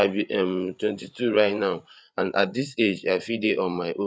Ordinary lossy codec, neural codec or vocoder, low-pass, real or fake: none; codec, 16 kHz, 8 kbps, FreqCodec, larger model; none; fake